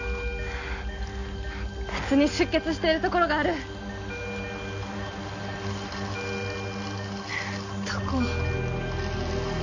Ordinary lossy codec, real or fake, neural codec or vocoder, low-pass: none; real; none; 7.2 kHz